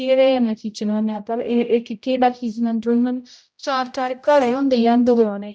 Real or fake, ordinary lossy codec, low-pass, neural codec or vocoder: fake; none; none; codec, 16 kHz, 0.5 kbps, X-Codec, HuBERT features, trained on general audio